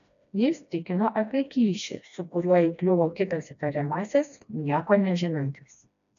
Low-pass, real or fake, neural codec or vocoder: 7.2 kHz; fake; codec, 16 kHz, 1 kbps, FreqCodec, smaller model